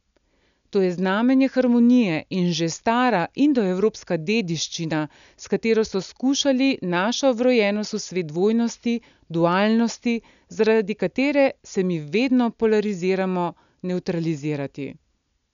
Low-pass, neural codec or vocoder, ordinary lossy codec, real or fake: 7.2 kHz; none; none; real